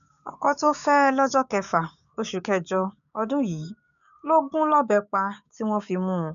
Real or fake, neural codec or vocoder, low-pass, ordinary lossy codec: fake; codec, 16 kHz, 6 kbps, DAC; 7.2 kHz; none